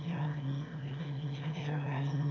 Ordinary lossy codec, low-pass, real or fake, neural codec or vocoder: none; 7.2 kHz; fake; autoencoder, 22.05 kHz, a latent of 192 numbers a frame, VITS, trained on one speaker